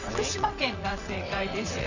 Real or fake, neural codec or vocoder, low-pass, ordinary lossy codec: fake; vocoder, 22.05 kHz, 80 mel bands, WaveNeXt; 7.2 kHz; none